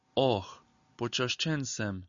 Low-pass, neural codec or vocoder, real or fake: 7.2 kHz; none; real